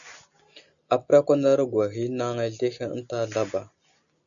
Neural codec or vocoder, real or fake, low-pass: none; real; 7.2 kHz